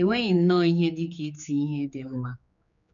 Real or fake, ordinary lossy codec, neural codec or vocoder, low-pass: fake; none; codec, 16 kHz, 4 kbps, X-Codec, HuBERT features, trained on general audio; 7.2 kHz